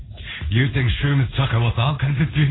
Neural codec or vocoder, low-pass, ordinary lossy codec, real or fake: codec, 16 kHz in and 24 kHz out, 1 kbps, XY-Tokenizer; 7.2 kHz; AAC, 16 kbps; fake